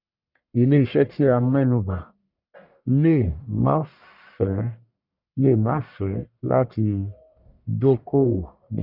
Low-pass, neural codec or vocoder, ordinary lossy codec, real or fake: 5.4 kHz; codec, 44.1 kHz, 1.7 kbps, Pupu-Codec; none; fake